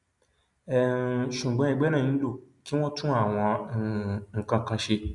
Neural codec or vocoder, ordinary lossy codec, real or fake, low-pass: none; none; real; 10.8 kHz